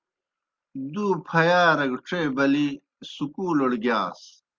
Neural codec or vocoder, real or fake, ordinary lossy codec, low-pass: none; real; Opus, 32 kbps; 7.2 kHz